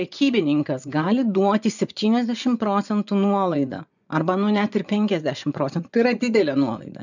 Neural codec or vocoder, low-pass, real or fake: vocoder, 24 kHz, 100 mel bands, Vocos; 7.2 kHz; fake